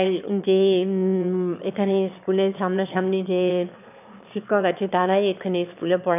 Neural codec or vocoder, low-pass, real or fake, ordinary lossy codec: autoencoder, 22.05 kHz, a latent of 192 numbers a frame, VITS, trained on one speaker; 3.6 kHz; fake; none